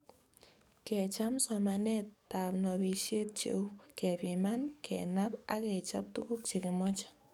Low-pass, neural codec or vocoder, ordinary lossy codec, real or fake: 19.8 kHz; codec, 44.1 kHz, 7.8 kbps, DAC; none; fake